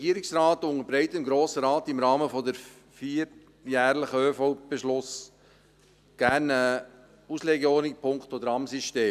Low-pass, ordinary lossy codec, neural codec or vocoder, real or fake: 14.4 kHz; none; none; real